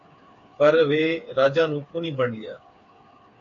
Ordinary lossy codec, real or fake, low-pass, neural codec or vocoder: AAC, 48 kbps; fake; 7.2 kHz; codec, 16 kHz, 4 kbps, FreqCodec, smaller model